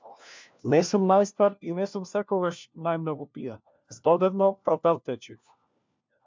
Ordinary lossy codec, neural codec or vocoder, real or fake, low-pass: MP3, 64 kbps; codec, 16 kHz, 1 kbps, FunCodec, trained on LibriTTS, 50 frames a second; fake; 7.2 kHz